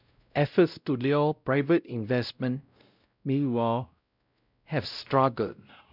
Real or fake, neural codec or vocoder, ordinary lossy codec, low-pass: fake; codec, 16 kHz, 0.5 kbps, X-Codec, WavLM features, trained on Multilingual LibriSpeech; none; 5.4 kHz